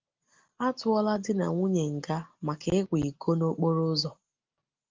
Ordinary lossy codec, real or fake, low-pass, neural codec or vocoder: Opus, 32 kbps; real; 7.2 kHz; none